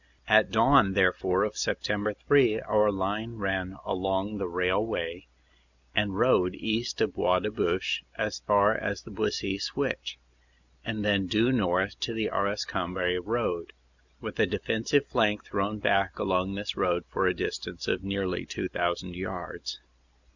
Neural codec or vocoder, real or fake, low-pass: none; real; 7.2 kHz